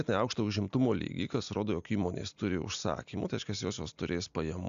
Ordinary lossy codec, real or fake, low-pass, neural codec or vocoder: AAC, 96 kbps; real; 7.2 kHz; none